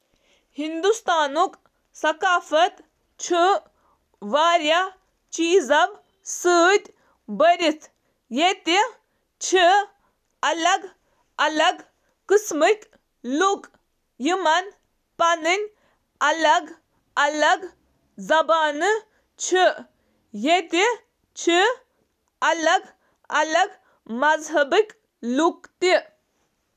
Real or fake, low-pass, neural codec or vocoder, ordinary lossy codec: real; 14.4 kHz; none; none